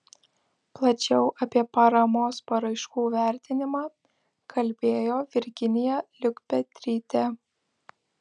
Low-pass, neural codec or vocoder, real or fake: 9.9 kHz; none; real